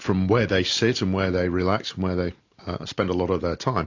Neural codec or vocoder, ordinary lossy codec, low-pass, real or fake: none; AAC, 48 kbps; 7.2 kHz; real